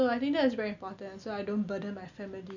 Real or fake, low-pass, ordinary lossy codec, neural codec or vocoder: real; 7.2 kHz; none; none